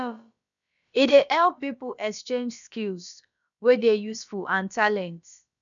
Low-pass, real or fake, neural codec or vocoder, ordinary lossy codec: 7.2 kHz; fake; codec, 16 kHz, about 1 kbps, DyCAST, with the encoder's durations; none